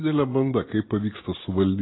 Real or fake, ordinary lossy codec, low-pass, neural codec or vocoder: real; AAC, 16 kbps; 7.2 kHz; none